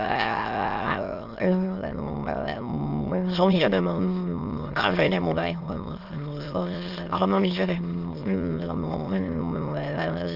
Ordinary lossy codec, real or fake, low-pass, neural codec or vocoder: Opus, 24 kbps; fake; 5.4 kHz; autoencoder, 22.05 kHz, a latent of 192 numbers a frame, VITS, trained on many speakers